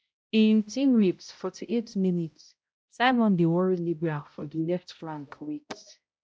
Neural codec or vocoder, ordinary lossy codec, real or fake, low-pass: codec, 16 kHz, 0.5 kbps, X-Codec, HuBERT features, trained on balanced general audio; none; fake; none